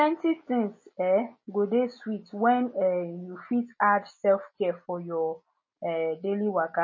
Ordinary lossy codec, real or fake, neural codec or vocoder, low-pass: MP3, 32 kbps; real; none; 7.2 kHz